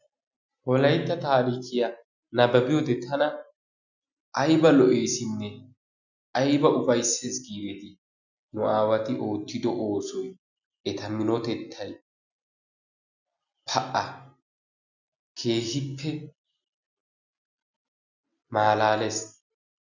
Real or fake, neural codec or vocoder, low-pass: real; none; 7.2 kHz